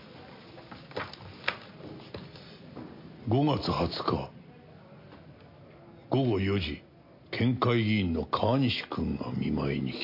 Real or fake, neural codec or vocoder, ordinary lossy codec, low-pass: real; none; MP3, 32 kbps; 5.4 kHz